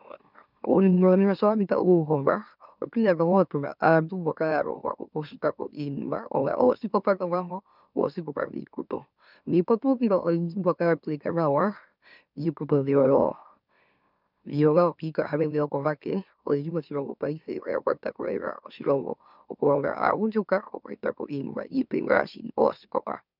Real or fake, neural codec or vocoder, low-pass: fake; autoencoder, 44.1 kHz, a latent of 192 numbers a frame, MeloTTS; 5.4 kHz